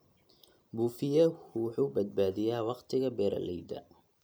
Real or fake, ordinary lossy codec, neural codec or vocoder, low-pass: real; none; none; none